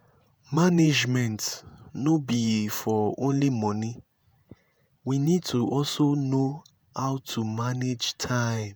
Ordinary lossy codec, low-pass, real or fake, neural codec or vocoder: none; none; fake; vocoder, 48 kHz, 128 mel bands, Vocos